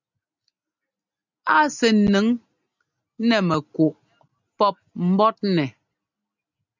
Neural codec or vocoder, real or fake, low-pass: none; real; 7.2 kHz